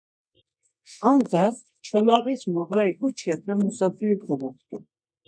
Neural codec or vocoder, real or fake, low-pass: codec, 24 kHz, 0.9 kbps, WavTokenizer, medium music audio release; fake; 9.9 kHz